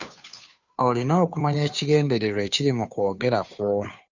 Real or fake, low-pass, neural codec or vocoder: fake; 7.2 kHz; codec, 16 kHz, 2 kbps, FunCodec, trained on Chinese and English, 25 frames a second